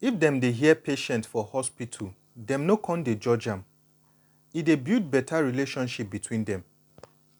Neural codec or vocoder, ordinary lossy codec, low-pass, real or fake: none; none; 19.8 kHz; real